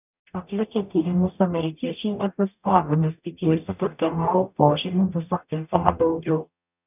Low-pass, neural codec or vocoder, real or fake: 3.6 kHz; codec, 44.1 kHz, 0.9 kbps, DAC; fake